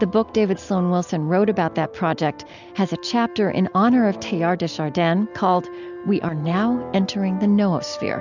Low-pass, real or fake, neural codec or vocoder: 7.2 kHz; real; none